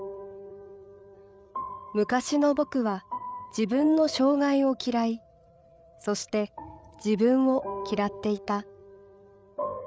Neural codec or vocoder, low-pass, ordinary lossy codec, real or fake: codec, 16 kHz, 8 kbps, FreqCodec, larger model; none; none; fake